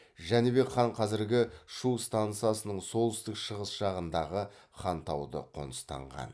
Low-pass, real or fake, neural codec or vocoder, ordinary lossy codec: none; real; none; none